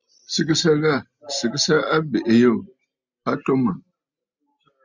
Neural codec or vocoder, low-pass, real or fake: none; 7.2 kHz; real